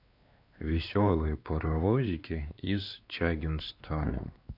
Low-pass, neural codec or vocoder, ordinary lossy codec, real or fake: 5.4 kHz; codec, 16 kHz, 2 kbps, X-Codec, WavLM features, trained on Multilingual LibriSpeech; AAC, 48 kbps; fake